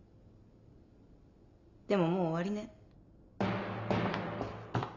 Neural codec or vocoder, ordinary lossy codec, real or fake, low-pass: none; none; real; 7.2 kHz